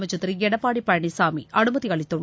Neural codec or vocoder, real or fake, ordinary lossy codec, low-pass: none; real; none; none